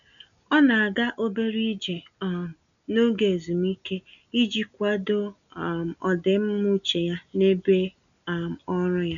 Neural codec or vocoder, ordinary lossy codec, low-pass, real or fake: none; none; 7.2 kHz; real